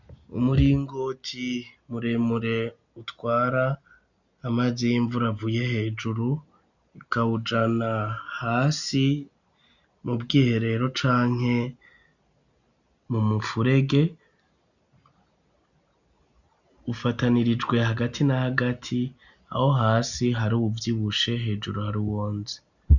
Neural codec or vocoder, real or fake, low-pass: none; real; 7.2 kHz